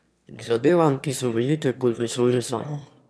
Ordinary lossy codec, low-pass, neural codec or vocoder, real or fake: none; none; autoencoder, 22.05 kHz, a latent of 192 numbers a frame, VITS, trained on one speaker; fake